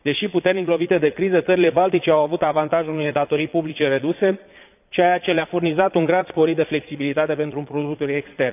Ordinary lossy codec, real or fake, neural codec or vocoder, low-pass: none; fake; vocoder, 22.05 kHz, 80 mel bands, Vocos; 3.6 kHz